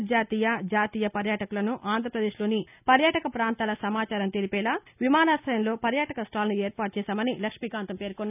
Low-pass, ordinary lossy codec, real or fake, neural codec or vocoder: 3.6 kHz; none; real; none